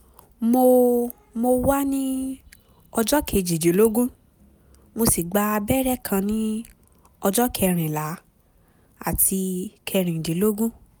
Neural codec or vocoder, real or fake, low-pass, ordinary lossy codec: none; real; none; none